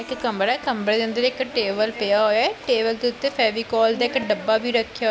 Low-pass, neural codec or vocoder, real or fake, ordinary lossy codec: none; none; real; none